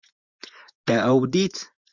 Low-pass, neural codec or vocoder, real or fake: 7.2 kHz; vocoder, 24 kHz, 100 mel bands, Vocos; fake